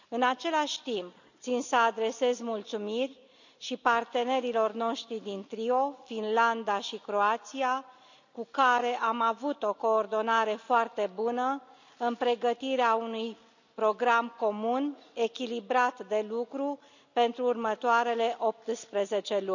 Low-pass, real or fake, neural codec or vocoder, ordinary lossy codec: 7.2 kHz; real; none; none